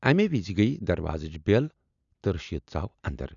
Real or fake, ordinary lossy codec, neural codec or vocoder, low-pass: real; none; none; 7.2 kHz